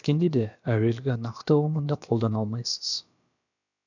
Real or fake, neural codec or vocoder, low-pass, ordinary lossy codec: fake; codec, 16 kHz, about 1 kbps, DyCAST, with the encoder's durations; 7.2 kHz; none